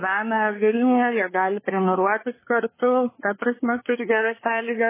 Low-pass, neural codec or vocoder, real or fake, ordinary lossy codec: 3.6 kHz; codec, 24 kHz, 1 kbps, SNAC; fake; MP3, 16 kbps